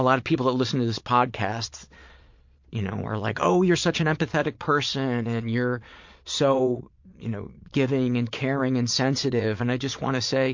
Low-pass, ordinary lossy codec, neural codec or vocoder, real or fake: 7.2 kHz; MP3, 48 kbps; vocoder, 22.05 kHz, 80 mel bands, Vocos; fake